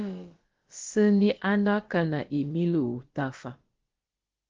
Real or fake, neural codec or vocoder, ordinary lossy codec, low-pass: fake; codec, 16 kHz, about 1 kbps, DyCAST, with the encoder's durations; Opus, 16 kbps; 7.2 kHz